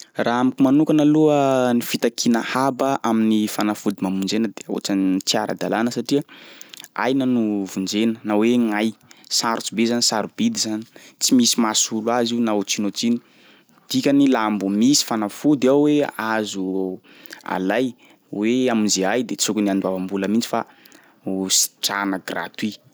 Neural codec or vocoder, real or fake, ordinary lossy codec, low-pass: none; real; none; none